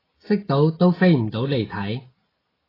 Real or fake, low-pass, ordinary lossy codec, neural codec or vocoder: real; 5.4 kHz; AAC, 24 kbps; none